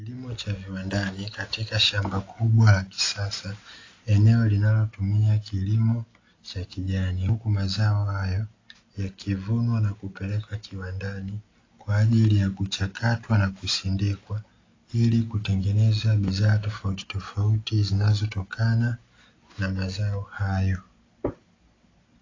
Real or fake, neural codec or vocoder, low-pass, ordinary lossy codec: real; none; 7.2 kHz; AAC, 32 kbps